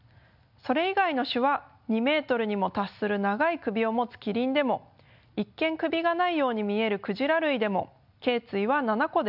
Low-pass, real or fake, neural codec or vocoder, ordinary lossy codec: 5.4 kHz; real; none; none